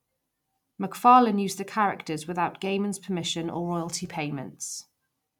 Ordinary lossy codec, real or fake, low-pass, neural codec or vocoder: none; real; 19.8 kHz; none